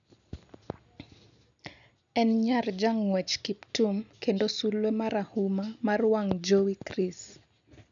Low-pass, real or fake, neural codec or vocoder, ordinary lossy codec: 7.2 kHz; real; none; none